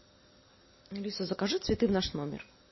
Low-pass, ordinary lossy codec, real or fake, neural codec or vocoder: 7.2 kHz; MP3, 24 kbps; real; none